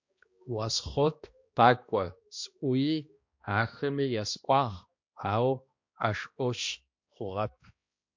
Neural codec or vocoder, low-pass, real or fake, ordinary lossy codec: codec, 16 kHz, 1 kbps, X-Codec, HuBERT features, trained on balanced general audio; 7.2 kHz; fake; MP3, 48 kbps